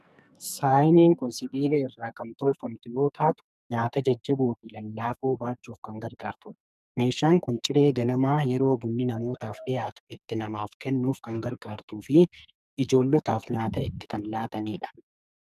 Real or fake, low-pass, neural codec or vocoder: fake; 14.4 kHz; codec, 44.1 kHz, 2.6 kbps, SNAC